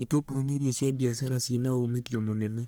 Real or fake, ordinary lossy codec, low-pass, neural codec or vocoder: fake; none; none; codec, 44.1 kHz, 1.7 kbps, Pupu-Codec